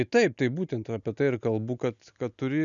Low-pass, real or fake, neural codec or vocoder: 7.2 kHz; real; none